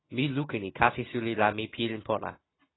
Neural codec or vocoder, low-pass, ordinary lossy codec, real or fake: none; 7.2 kHz; AAC, 16 kbps; real